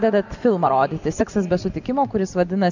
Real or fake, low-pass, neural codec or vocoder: real; 7.2 kHz; none